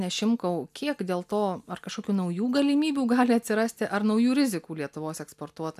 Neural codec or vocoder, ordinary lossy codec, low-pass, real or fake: none; AAC, 96 kbps; 14.4 kHz; real